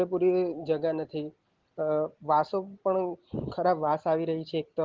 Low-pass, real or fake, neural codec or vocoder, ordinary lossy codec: 7.2 kHz; real; none; Opus, 16 kbps